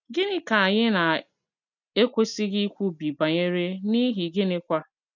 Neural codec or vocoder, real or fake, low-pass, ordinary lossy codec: none; real; 7.2 kHz; none